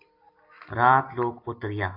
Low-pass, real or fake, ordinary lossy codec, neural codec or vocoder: 5.4 kHz; real; AAC, 32 kbps; none